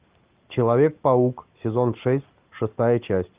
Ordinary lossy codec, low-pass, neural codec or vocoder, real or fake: Opus, 24 kbps; 3.6 kHz; none; real